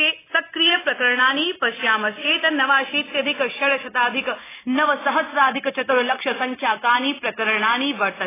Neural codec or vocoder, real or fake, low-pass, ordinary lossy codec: none; real; 3.6 kHz; AAC, 16 kbps